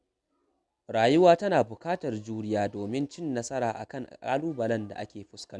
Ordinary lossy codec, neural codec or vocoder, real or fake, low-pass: none; none; real; none